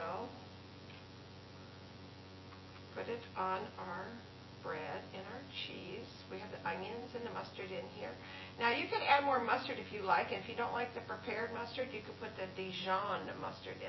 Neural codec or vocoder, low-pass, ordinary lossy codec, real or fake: vocoder, 24 kHz, 100 mel bands, Vocos; 7.2 kHz; MP3, 24 kbps; fake